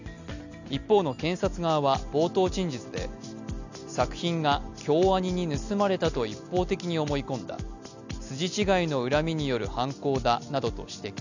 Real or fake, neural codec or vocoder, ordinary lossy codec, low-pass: real; none; none; 7.2 kHz